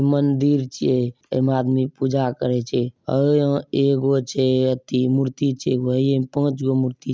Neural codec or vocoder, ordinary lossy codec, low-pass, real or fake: none; none; none; real